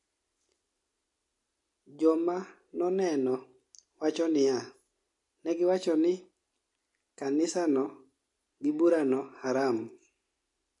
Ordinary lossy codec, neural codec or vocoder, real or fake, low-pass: MP3, 48 kbps; none; real; 10.8 kHz